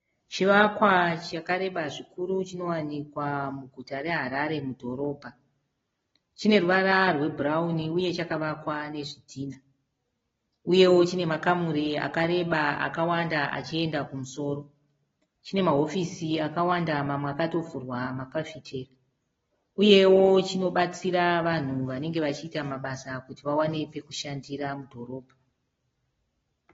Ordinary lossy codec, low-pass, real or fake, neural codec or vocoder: AAC, 24 kbps; 7.2 kHz; real; none